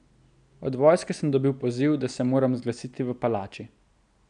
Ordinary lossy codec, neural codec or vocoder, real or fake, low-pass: none; none; real; 9.9 kHz